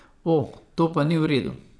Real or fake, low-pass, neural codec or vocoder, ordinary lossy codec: fake; none; vocoder, 22.05 kHz, 80 mel bands, Vocos; none